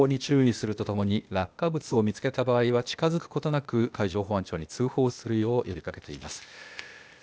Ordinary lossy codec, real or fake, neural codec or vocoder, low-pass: none; fake; codec, 16 kHz, 0.8 kbps, ZipCodec; none